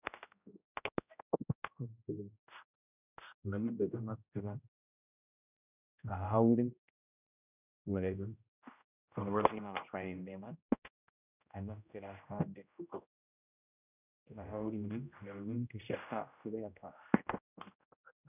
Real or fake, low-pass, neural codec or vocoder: fake; 3.6 kHz; codec, 16 kHz, 0.5 kbps, X-Codec, HuBERT features, trained on general audio